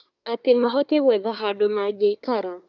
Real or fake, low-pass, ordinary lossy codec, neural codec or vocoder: fake; 7.2 kHz; none; codec, 24 kHz, 1 kbps, SNAC